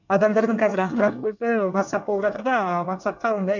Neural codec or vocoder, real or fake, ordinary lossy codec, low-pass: codec, 24 kHz, 1 kbps, SNAC; fake; none; 7.2 kHz